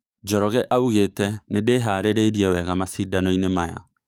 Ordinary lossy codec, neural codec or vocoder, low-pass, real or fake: none; codec, 44.1 kHz, 7.8 kbps, DAC; 19.8 kHz; fake